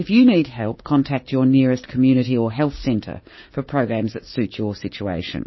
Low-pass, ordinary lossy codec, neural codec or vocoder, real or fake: 7.2 kHz; MP3, 24 kbps; codec, 16 kHz, 6 kbps, DAC; fake